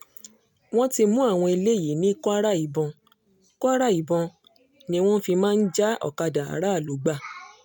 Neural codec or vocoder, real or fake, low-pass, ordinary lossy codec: none; real; 19.8 kHz; none